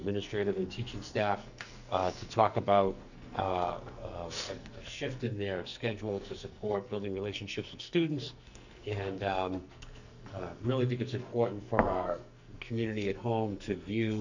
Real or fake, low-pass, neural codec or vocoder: fake; 7.2 kHz; codec, 44.1 kHz, 2.6 kbps, SNAC